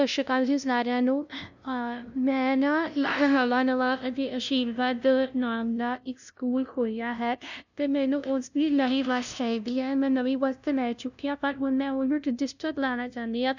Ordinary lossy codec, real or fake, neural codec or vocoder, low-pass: none; fake; codec, 16 kHz, 0.5 kbps, FunCodec, trained on LibriTTS, 25 frames a second; 7.2 kHz